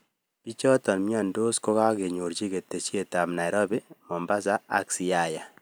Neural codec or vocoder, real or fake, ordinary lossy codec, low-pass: none; real; none; none